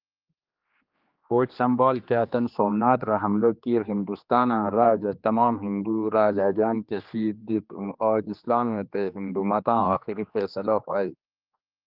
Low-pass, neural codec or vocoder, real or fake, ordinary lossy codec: 5.4 kHz; codec, 16 kHz, 2 kbps, X-Codec, HuBERT features, trained on general audio; fake; Opus, 24 kbps